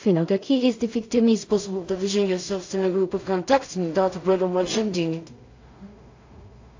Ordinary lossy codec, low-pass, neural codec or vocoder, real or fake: AAC, 48 kbps; 7.2 kHz; codec, 16 kHz in and 24 kHz out, 0.4 kbps, LongCat-Audio-Codec, two codebook decoder; fake